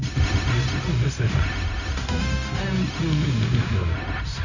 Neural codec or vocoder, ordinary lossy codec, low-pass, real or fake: codec, 16 kHz, 0.4 kbps, LongCat-Audio-Codec; none; 7.2 kHz; fake